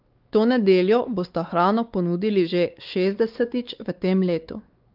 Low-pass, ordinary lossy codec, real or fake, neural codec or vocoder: 5.4 kHz; Opus, 32 kbps; fake; codec, 16 kHz, 4 kbps, X-Codec, WavLM features, trained on Multilingual LibriSpeech